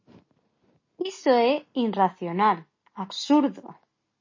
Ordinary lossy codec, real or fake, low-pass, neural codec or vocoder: MP3, 32 kbps; real; 7.2 kHz; none